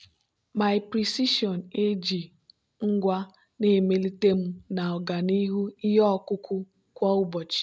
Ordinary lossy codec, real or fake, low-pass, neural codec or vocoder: none; real; none; none